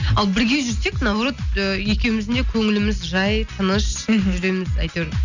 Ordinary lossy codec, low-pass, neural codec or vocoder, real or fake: none; 7.2 kHz; none; real